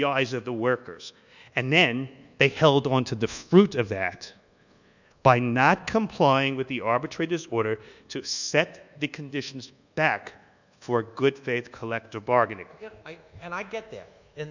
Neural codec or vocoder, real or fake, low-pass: codec, 24 kHz, 1.2 kbps, DualCodec; fake; 7.2 kHz